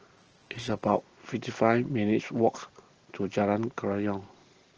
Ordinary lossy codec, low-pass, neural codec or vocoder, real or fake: Opus, 16 kbps; 7.2 kHz; none; real